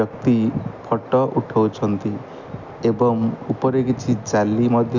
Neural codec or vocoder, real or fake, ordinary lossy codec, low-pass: none; real; none; 7.2 kHz